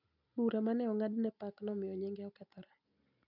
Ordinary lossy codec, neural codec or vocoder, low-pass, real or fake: none; none; 5.4 kHz; real